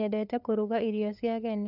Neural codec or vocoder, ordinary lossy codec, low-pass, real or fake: codec, 16 kHz, 8 kbps, FunCodec, trained on LibriTTS, 25 frames a second; none; 5.4 kHz; fake